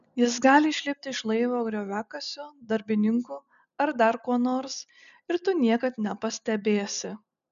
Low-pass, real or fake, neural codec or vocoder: 7.2 kHz; real; none